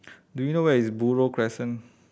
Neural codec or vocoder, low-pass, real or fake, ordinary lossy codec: none; none; real; none